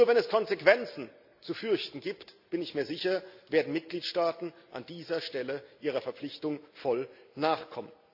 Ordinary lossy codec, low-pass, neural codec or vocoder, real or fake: AAC, 48 kbps; 5.4 kHz; none; real